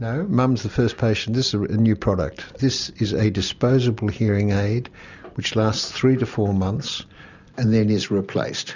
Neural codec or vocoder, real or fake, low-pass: none; real; 7.2 kHz